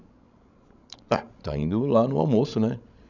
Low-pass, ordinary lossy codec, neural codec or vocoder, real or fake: 7.2 kHz; none; codec, 16 kHz, 16 kbps, FreqCodec, larger model; fake